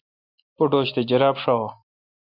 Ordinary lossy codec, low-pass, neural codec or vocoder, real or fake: MP3, 48 kbps; 5.4 kHz; none; real